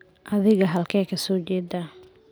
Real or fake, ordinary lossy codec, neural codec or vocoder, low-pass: real; none; none; none